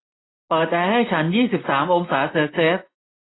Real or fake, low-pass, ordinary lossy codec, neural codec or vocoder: real; 7.2 kHz; AAC, 16 kbps; none